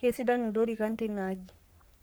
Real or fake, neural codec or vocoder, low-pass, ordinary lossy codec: fake; codec, 44.1 kHz, 3.4 kbps, Pupu-Codec; none; none